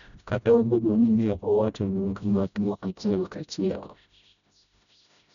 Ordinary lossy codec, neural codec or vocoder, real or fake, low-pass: none; codec, 16 kHz, 0.5 kbps, FreqCodec, smaller model; fake; 7.2 kHz